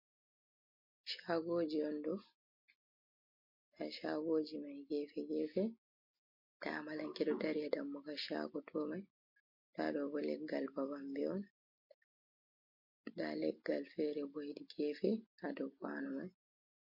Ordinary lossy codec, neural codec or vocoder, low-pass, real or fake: MP3, 32 kbps; none; 5.4 kHz; real